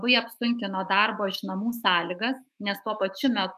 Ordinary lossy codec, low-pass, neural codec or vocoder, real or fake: MP3, 96 kbps; 14.4 kHz; autoencoder, 48 kHz, 128 numbers a frame, DAC-VAE, trained on Japanese speech; fake